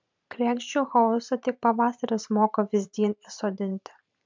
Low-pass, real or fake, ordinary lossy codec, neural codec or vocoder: 7.2 kHz; fake; MP3, 64 kbps; vocoder, 44.1 kHz, 128 mel bands every 512 samples, BigVGAN v2